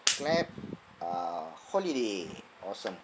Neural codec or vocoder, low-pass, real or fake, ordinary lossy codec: none; none; real; none